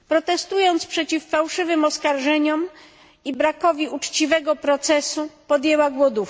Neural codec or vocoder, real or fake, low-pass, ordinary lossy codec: none; real; none; none